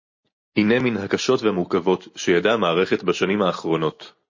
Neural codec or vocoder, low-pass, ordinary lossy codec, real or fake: none; 7.2 kHz; MP3, 32 kbps; real